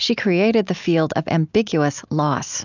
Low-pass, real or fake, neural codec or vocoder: 7.2 kHz; real; none